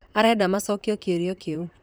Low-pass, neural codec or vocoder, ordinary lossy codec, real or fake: none; vocoder, 44.1 kHz, 128 mel bands, Pupu-Vocoder; none; fake